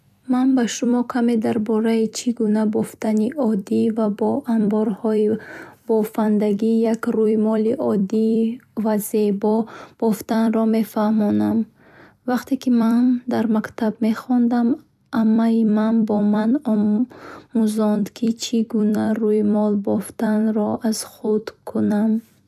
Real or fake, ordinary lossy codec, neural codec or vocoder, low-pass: fake; none; vocoder, 44.1 kHz, 128 mel bands every 256 samples, BigVGAN v2; 14.4 kHz